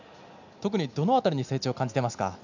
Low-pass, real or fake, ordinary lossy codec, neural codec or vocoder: 7.2 kHz; real; none; none